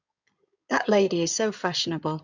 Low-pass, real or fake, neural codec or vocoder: 7.2 kHz; fake; codec, 16 kHz in and 24 kHz out, 2.2 kbps, FireRedTTS-2 codec